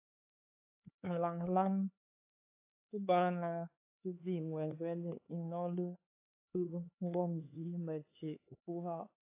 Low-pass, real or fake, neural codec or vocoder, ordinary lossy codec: 3.6 kHz; fake; codec, 16 kHz, 4 kbps, X-Codec, HuBERT features, trained on LibriSpeech; AAC, 24 kbps